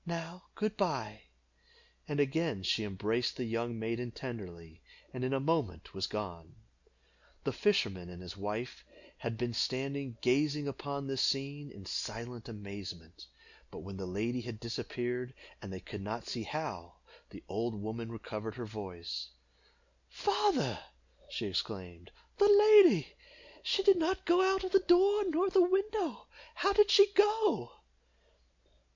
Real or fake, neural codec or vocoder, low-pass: real; none; 7.2 kHz